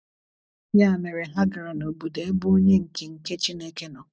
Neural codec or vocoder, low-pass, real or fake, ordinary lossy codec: none; none; real; none